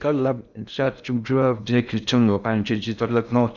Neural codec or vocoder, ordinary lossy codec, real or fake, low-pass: codec, 16 kHz in and 24 kHz out, 0.6 kbps, FocalCodec, streaming, 2048 codes; none; fake; 7.2 kHz